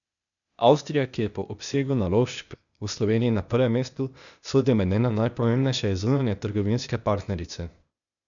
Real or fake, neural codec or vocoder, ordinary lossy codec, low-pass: fake; codec, 16 kHz, 0.8 kbps, ZipCodec; none; 7.2 kHz